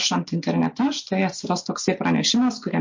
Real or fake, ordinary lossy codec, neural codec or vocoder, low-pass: real; MP3, 48 kbps; none; 7.2 kHz